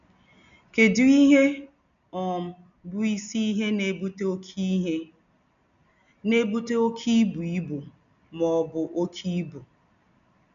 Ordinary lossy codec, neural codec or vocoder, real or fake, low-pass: none; none; real; 7.2 kHz